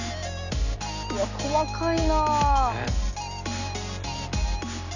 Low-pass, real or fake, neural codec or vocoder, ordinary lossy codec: 7.2 kHz; real; none; none